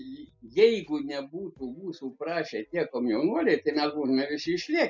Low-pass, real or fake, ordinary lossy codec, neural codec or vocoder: 7.2 kHz; real; MP3, 64 kbps; none